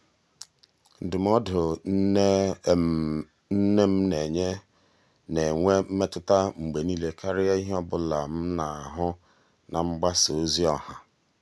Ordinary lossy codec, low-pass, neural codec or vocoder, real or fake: none; none; none; real